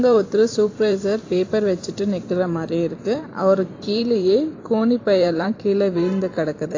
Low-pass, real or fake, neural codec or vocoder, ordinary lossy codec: 7.2 kHz; fake; vocoder, 44.1 kHz, 128 mel bands every 512 samples, BigVGAN v2; AAC, 32 kbps